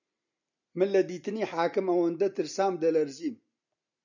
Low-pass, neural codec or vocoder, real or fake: 7.2 kHz; none; real